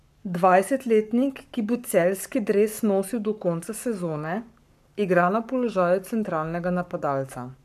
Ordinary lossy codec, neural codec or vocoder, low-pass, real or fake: none; codec, 44.1 kHz, 7.8 kbps, Pupu-Codec; 14.4 kHz; fake